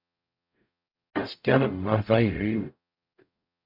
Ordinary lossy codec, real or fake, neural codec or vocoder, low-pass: MP3, 48 kbps; fake; codec, 44.1 kHz, 0.9 kbps, DAC; 5.4 kHz